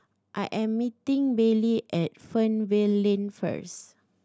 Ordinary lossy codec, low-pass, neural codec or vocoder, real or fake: none; none; none; real